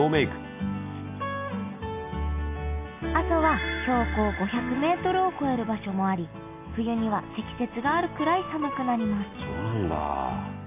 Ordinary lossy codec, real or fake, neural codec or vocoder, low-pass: AAC, 24 kbps; real; none; 3.6 kHz